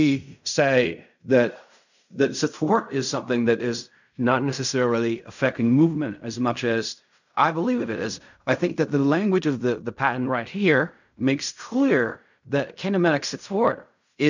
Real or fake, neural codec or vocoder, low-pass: fake; codec, 16 kHz in and 24 kHz out, 0.4 kbps, LongCat-Audio-Codec, fine tuned four codebook decoder; 7.2 kHz